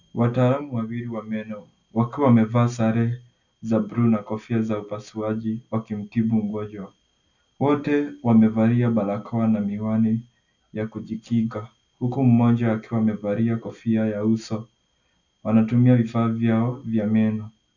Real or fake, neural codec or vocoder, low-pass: real; none; 7.2 kHz